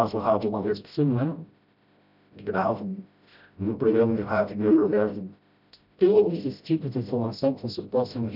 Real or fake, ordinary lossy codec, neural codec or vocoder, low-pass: fake; none; codec, 16 kHz, 0.5 kbps, FreqCodec, smaller model; 5.4 kHz